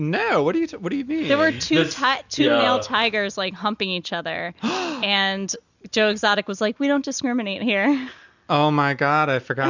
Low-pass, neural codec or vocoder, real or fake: 7.2 kHz; none; real